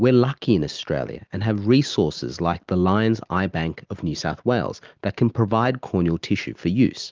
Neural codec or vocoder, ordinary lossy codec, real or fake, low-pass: none; Opus, 32 kbps; real; 7.2 kHz